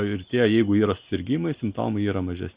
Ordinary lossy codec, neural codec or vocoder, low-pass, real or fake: Opus, 64 kbps; none; 3.6 kHz; real